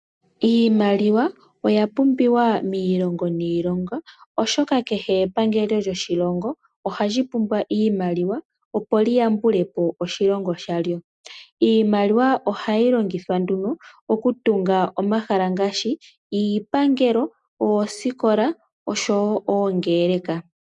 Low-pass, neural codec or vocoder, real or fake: 10.8 kHz; none; real